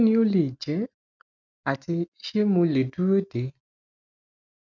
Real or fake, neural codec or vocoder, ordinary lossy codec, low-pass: real; none; none; 7.2 kHz